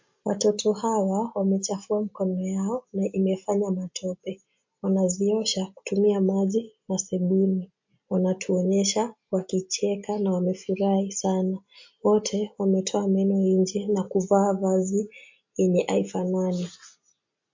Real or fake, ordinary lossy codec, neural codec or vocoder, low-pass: real; MP3, 48 kbps; none; 7.2 kHz